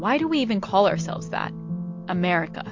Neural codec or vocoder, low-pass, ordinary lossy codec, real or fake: none; 7.2 kHz; MP3, 48 kbps; real